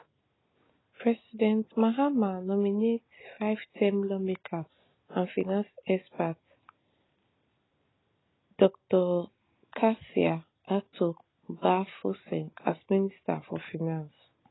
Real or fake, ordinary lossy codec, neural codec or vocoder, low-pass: real; AAC, 16 kbps; none; 7.2 kHz